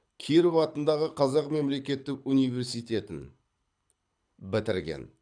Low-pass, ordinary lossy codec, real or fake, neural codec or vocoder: 9.9 kHz; none; fake; codec, 24 kHz, 6 kbps, HILCodec